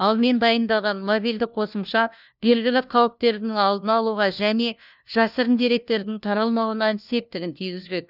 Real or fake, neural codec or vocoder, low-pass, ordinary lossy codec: fake; codec, 16 kHz, 1 kbps, FunCodec, trained on LibriTTS, 50 frames a second; 5.4 kHz; none